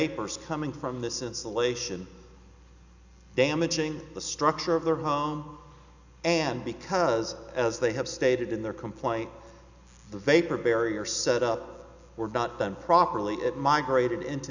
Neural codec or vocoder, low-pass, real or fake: none; 7.2 kHz; real